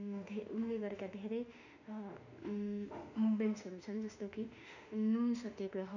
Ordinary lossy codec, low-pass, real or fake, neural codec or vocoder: none; 7.2 kHz; fake; autoencoder, 48 kHz, 32 numbers a frame, DAC-VAE, trained on Japanese speech